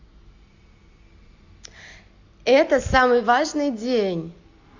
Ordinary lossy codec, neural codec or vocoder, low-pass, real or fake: AAC, 48 kbps; none; 7.2 kHz; real